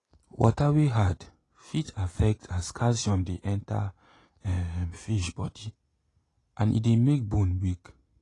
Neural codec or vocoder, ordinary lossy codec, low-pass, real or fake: none; AAC, 32 kbps; 10.8 kHz; real